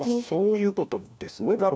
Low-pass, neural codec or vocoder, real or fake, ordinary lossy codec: none; codec, 16 kHz, 1 kbps, FunCodec, trained on LibriTTS, 50 frames a second; fake; none